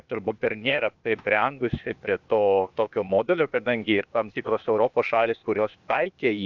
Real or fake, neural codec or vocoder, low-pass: fake; codec, 16 kHz, 0.8 kbps, ZipCodec; 7.2 kHz